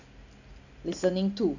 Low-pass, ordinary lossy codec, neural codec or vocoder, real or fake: 7.2 kHz; none; none; real